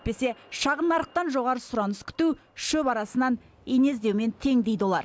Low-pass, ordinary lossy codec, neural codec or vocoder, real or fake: none; none; none; real